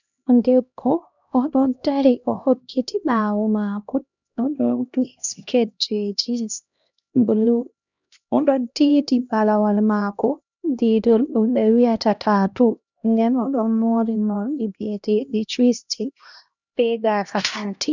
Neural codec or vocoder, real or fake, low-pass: codec, 16 kHz, 1 kbps, X-Codec, HuBERT features, trained on LibriSpeech; fake; 7.2 kHz